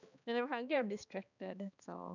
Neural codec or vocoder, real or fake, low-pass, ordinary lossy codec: codec, 16 kHz, 2 kbps, X-Codec, HuBERT features, trained on balanced general audio; fake; 7.2 kHz; none